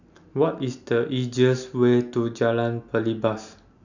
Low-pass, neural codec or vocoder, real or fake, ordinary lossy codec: 7.2 kHz; none; real; none